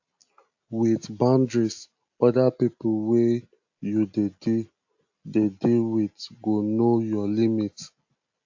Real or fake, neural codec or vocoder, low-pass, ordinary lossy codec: real; none; 7.2 kHz; AAC, 48 kbps